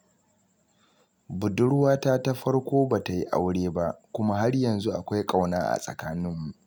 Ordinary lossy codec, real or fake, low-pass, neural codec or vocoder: none; real; none; none